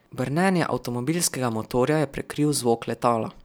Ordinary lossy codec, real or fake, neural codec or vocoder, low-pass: none; real; none; none